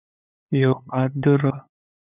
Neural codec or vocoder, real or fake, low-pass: codec, 16 kHz, 8 kbps, FunCodec, trained on LibriTTS, 25 frames a second; fake; 3.6 kHz